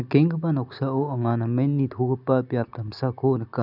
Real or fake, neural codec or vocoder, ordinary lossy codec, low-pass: real; none; none; 5.4 kHz